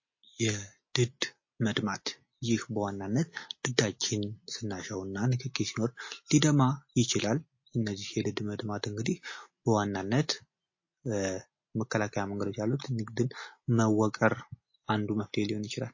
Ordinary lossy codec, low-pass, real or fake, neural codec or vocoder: MP3, 32 kbps; 7.2 kHz; real; none